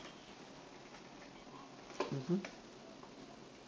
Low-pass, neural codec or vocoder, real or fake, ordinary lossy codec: none; none; real; none